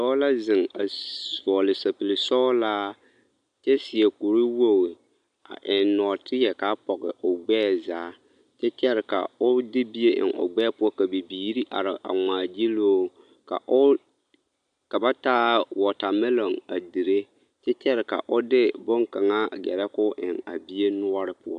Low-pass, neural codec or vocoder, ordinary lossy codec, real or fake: 9.9 kHz; none; AAC, 96 kbps; real